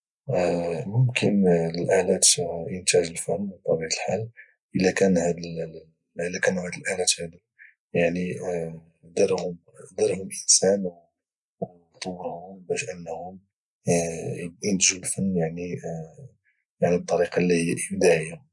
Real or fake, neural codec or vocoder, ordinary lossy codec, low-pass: real; none; none; 10.8 kHz